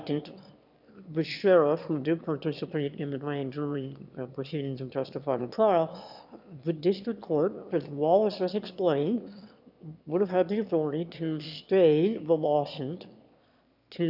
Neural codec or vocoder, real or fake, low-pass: autoencoder, 22.05 kHz, a latent of 192 numbers a frame, VITS, trained on one speaker; fake; 5.4 kHz